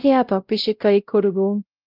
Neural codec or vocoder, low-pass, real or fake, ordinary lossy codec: codec, 16 kHz, 0.5 kbps, X-Codec, WavLM features, trained on Multilingual LibriSpeech; 5.4 kHz; fake; Opus, 24 kbps